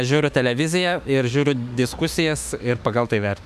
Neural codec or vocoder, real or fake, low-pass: autoencoder, 48 kHz, 32 numbers a frame, DAC-VAE, trained on Japanese speech; fake; 14.4 kHz